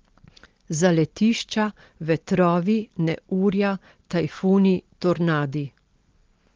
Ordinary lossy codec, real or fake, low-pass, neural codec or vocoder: Opus, 16 kbps; real; 7.2 kHz; none